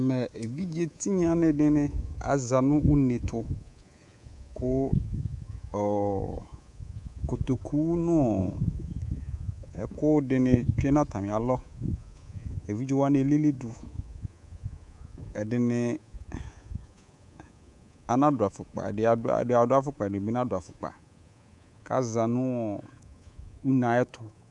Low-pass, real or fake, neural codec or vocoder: 10.8 kHz; fake; codec, 24 kHz, 3.1 kbps, DualCodec